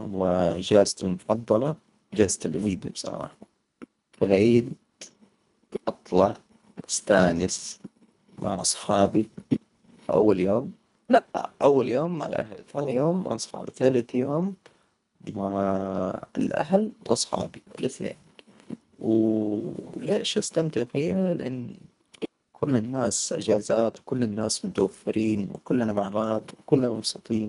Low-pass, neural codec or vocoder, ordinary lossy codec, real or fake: 10.8 kHz; codec, 24 kHz, 1.5 kbps, HILCodec; none; fake